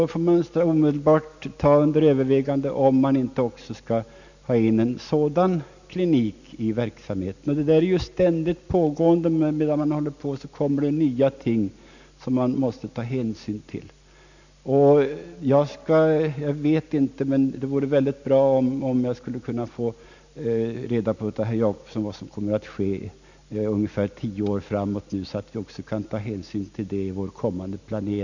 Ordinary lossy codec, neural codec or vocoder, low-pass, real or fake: none; none; 7.2 kHz; real